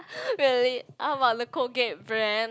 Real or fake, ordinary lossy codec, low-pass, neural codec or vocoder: real; none; none; none